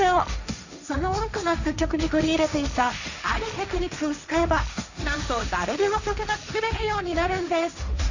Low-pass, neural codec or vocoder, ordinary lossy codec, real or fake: 7.2 kHz; codec, 16 kHz, 1.1 kbps, Voila-Tokenizer; none; fake